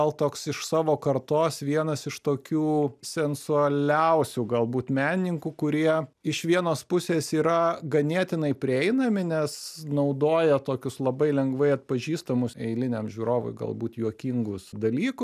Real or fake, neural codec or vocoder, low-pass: real; none; 14.4 kHz